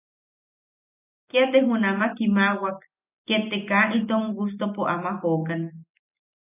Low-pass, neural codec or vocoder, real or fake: 3.6 kHz; none; real